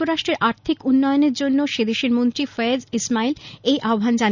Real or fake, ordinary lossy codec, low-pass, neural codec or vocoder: real; none; 7.2 kHz; none